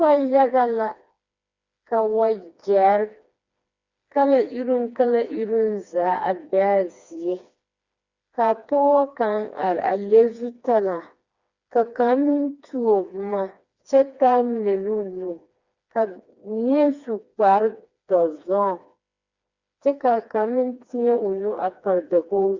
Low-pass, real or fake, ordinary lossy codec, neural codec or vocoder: 7.2 kHz; fake; Opus, 64 kbps; codec, 16 kHz, 2 kbps, FreqCodec, smaller model